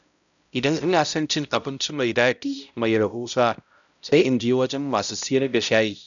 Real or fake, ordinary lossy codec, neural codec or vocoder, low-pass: fake; none; codec, 16 kHz, 0.5 kbps, X-Codec, HuBERT features, trained on balanced general audio; 7.2 kHz